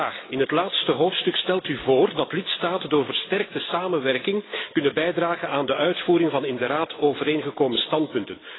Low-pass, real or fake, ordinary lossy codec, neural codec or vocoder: 7.2 kHz; real; AAC, 16 kbps; none